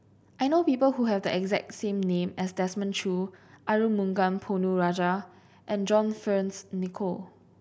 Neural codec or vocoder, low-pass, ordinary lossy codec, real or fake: none; none; none; real